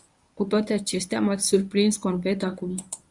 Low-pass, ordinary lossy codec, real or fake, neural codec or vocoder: 10.8 kHz; Opus, 64 kbps; fake; codec, 24 kHz, 0.9 kbps, WavTokenizer, medium speech release version 1